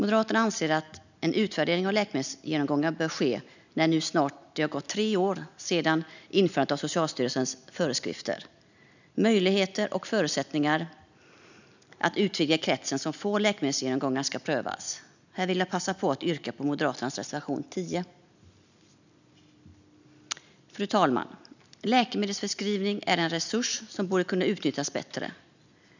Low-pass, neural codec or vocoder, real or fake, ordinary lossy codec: 7.2 kHz; none; real; none